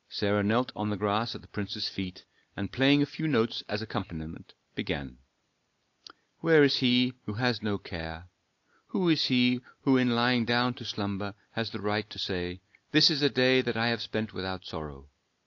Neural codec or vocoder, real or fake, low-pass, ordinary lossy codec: none; real; 7.2 kHz; AAC, 48 kbps